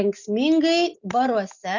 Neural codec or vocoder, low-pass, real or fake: none; 7.2 kHz; real